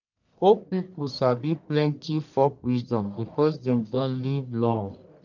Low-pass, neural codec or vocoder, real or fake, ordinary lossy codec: 7.2 kHz; codec, 44.1 kHz, 1.7 kbps, Pupu-Codec; fake; none